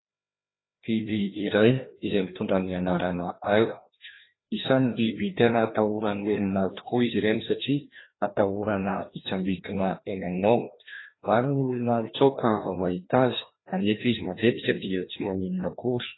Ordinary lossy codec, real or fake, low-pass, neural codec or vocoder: AAC, 16 kbps; fake; 7.2 kHz; codec, 16 kHz, 1 kbps, FreqCodec, larger model